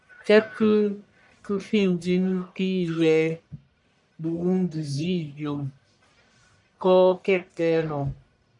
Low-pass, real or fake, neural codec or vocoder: 10.8 kHz; fake; codec, 44.1 kHz, 1.7 kbps, Pupu-Codec